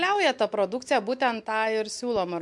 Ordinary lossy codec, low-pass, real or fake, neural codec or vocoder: MP3, 64 kbps; 10.8 kHz; real; none